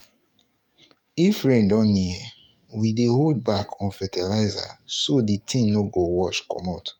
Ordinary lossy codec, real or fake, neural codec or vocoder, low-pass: none; fake; codec, 44.1 kHz, 7.8 kbps, DAC; 19.8 kHz